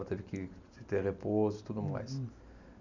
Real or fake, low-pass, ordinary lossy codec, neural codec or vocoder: real; 7.2 kHz; none; none